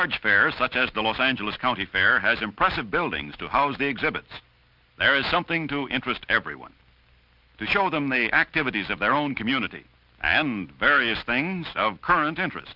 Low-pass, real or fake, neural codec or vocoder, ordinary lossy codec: 5.4 kHz; real; none; Opus, 24 kbps